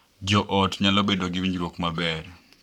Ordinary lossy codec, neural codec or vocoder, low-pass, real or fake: none; codec, 44.1 kHz, 7.8 kbps, Pupu-Codec; 19.8 kHz; fake